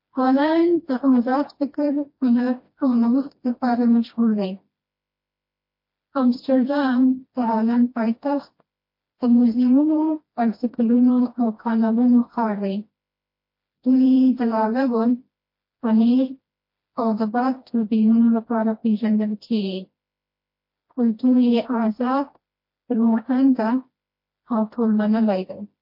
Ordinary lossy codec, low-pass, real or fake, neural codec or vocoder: MP3, 32 kbps; 5.4 kHz; fake; codec, 16 kHz, 1 kbps, FreqCodec, smaller model